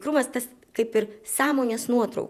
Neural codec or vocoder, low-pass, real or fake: vocoder, 48 kHz, 128 mel bands, Vocos; 14.4 kHz; fake